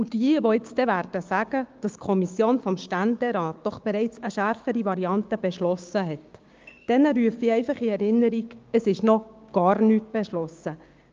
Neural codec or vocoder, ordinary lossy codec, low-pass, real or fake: codec, 16 kHz, 6 kbps, DAC; Opus, 24 kbps; 7.2 kHz; fake